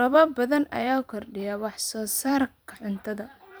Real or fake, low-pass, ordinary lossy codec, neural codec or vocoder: fake; none; none; vocoder, 44.1 kHz, 128 mel bands every 512 samples, BigVGAN v2